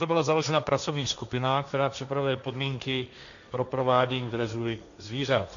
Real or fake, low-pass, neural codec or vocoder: fake; 7.2 kHz; codec, 16 kHz, 1.1 kbps, Voila-Tokenizer